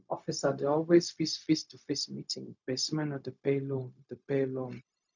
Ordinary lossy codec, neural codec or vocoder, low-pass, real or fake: none; codec, 16 kHz, 0.4 kbps, LongCat-Audio-Codec; 7.2 kHz; fake